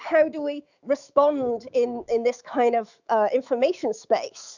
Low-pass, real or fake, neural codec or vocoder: 7.2 kHz; fake; autoencoder, 48 kHz, 128 numbers a frame, DAC-VAE, trained on Japanese speech